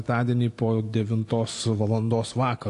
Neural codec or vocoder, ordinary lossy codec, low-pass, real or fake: none; AAC, 48 kbps; 10.8 kHz; real